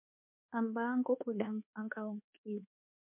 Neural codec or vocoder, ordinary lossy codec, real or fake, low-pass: codec, 24 kHz, 1.2 kbps, DualCodec; MP3, 32 kbps; fake; 3.6 kHz